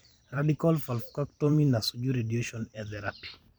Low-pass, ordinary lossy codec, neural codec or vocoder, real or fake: none; none; vocoder, 44.1 kHz, 128 mel bands every 512 samples, BigVGAN v2; fake